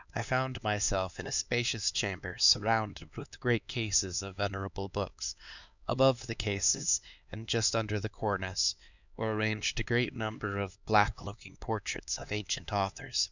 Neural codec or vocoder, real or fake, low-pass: codec, 16 kHz, 4 kbps, X-Codec, HuBERT features, trained on LibriSpeech; fake; 7.2 kHz